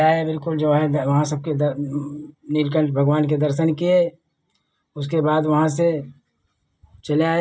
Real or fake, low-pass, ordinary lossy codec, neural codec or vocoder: real; none; none; none